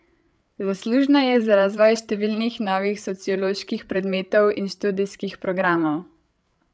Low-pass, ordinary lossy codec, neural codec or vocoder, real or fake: none; none; codec, 16 kHz, 8 kbps, FreqCodec, larger model; fake